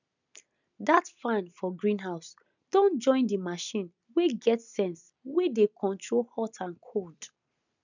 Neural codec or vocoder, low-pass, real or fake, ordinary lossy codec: none; 7.2 kHz; real; none